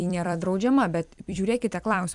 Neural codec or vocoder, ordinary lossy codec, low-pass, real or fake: vocoder, 44.1 kHz, 128 mel bands every 256 samples, BigVGAN v2; MP3, 96 kbps; 10.8 kHz; fake